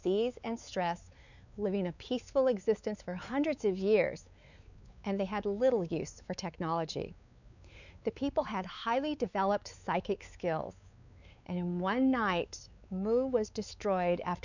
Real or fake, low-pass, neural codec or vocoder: fake; 7.2 kHz; codec, 16 kHz, 4 kbps, X-Codec, WavLM features, trained on Multilingual LibriSpeech